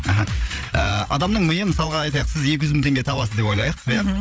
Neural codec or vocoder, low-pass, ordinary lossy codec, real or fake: codec, 16 kHz, 8 kbps, FreqCodec, larger model; none; none; fake